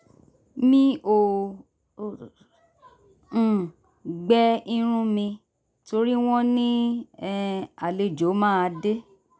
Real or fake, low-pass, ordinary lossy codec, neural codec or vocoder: real; none; none; none